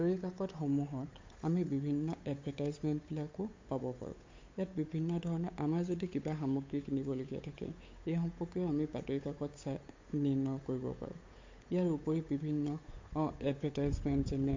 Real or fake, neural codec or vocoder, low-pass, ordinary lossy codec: fake; codec, 16 kHz, 8 kbps, FunCodec, trained on Chinese and English, 25 frames a second; 7.2 kHz; MP3, 48 kbps